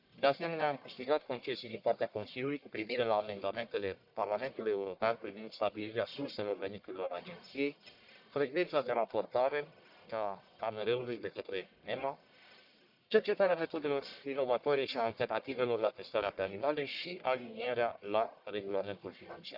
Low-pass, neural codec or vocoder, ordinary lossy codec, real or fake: 5.4 kHz; codec, 44.1 kHz, 1.7 kbps, Pupu-Codec; none; fake